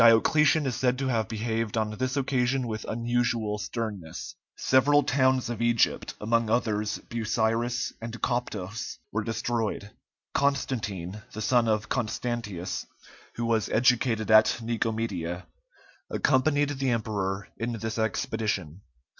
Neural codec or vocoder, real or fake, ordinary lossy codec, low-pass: none; real; MP3, 64 kbps; 7.2 kHz